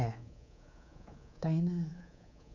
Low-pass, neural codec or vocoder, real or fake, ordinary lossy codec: 7.2 kHz; codec, 24 kHz, 3.1 kbps, DualCodec; fake; none